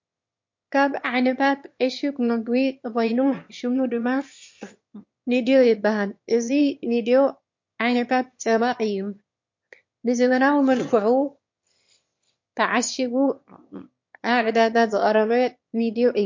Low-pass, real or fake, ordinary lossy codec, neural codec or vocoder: 7.2 kHz; fake; MP3, 48 kbps; autoencoder, 22.05 kHz, a latent of 192 numbers a frame, VITS, trained on one speaker